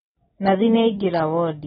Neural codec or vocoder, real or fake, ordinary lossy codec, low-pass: none; real; AAC, 16 kbps; 19.8 kHz